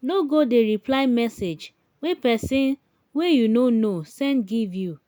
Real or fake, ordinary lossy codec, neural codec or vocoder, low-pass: real; none; none; none